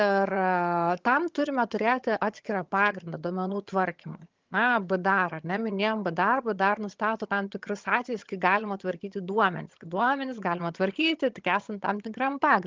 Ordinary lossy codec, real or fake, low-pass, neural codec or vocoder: Opus, 32 kbps; fake; 7.2 kHz; vocoder, 22.05 kHz, 80 mel bands, HiFi-GAN